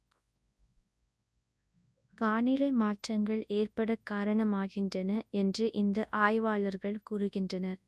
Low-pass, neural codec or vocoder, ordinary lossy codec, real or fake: none; codec, 24 kHz, 0.9 kbps, WavTokenizer, large speech release; none; fake